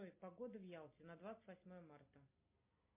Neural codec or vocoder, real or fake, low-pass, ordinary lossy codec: none; real; 3.6 kHz; MP3, 24 kbps